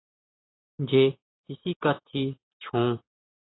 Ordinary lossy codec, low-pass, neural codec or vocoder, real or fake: AAC, 16 kbps; 7.2 kHz; none; real